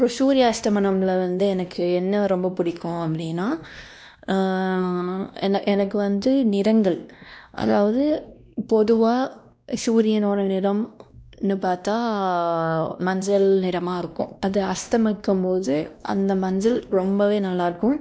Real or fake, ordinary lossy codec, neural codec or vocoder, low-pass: fake; none; codec, 16 kHz, 1 kbps, X-Codec, WavLM features, trained on Multilingual LibriSpeech; none